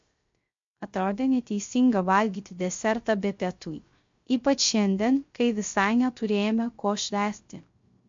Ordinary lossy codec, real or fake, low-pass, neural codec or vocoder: MP3, 48 kbps; fake; 7.2 kHz; codec, 16 kHz, 0.3 kbps, FocalCodec